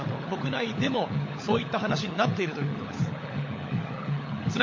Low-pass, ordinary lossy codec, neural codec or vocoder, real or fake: 7.2 kHz; MP3, 32 kbps; codec, 16 kHz, 16 kbps, FunCodec, trained on LibriTTS, 50 frames a second; fake